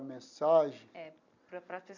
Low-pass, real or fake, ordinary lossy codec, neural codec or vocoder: 7.2 kHz; real; none; none